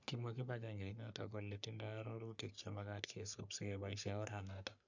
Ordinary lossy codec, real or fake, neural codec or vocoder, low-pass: none; fake; codec, 44.1 kHz, 3.4 kbps, Pupu-Codec; 7.2 kHz